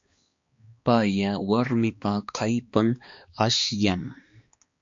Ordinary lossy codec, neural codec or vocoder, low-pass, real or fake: MP3, 48 kbps; codec, 16 kHz, 2 kbps, X-Codec, HuBERT features, trained on balanced general audio; 7.2 kHz; fake